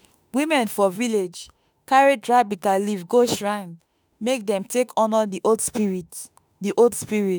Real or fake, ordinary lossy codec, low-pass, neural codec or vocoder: fake; none; none; autoencoder, 48 kHz, 32 numbers a frame, DAC-VAE, trained on Japanese speech